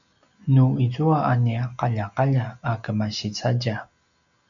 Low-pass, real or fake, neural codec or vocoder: 7.2 kHz; real; none